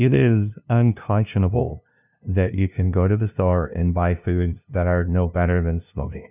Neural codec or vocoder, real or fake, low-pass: codec, 16 kHz, 0.5 kbps, FunCodec, trained on LibriTTS, 25 frames a second; fake; 3.6 kHz